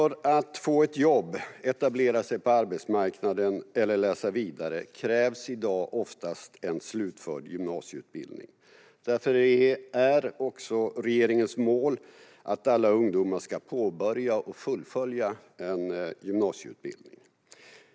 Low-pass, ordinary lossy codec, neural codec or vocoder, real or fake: none; none; none; real